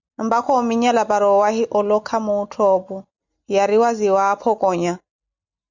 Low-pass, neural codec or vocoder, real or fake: 7.2 kHz; none; real